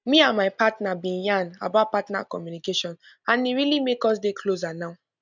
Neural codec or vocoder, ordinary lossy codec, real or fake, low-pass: none; none; real; 7.2 kHz